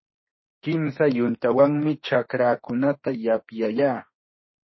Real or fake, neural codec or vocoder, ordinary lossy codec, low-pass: fake; autoencoder, 48 kHz, 32 numbers a frame, DAC-VAE, trained on Japanese speech; MP3, 24 kbps; 7.2 kHz